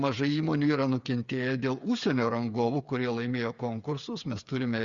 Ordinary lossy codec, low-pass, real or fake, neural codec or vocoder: Opus, 64 kbps; 7.2 kHz; fake; codec, 16 kHz, 8 kbps, FreqCodec, smaller model